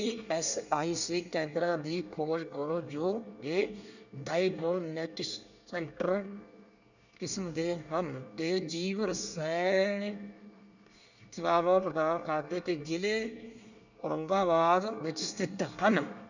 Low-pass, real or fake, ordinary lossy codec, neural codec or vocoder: 7.2 kHz; fake; none; codec, 24 kHz, 1 kbps, SNAC